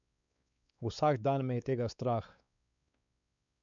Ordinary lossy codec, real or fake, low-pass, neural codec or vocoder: none; fake; 7.2 kHz; codec, 16 kHz, 4 kbps, X-Codec, WavLM features, trained on Multilingual LibriSpeech